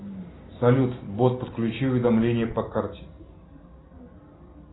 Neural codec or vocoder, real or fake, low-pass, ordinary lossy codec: none; real; 7.2 kHz; AAC, 16 kbps